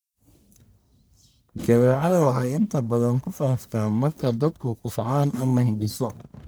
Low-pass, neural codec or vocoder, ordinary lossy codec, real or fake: none; codec, 44.1 kHz, 1.7 kbps, Pupu-Codec; none; fake